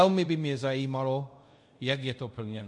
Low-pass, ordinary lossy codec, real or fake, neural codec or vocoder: 10.8 kHz; MP3, 48 kbps; fake; codec, 24 kHz, 0.5 kbps, DualCodec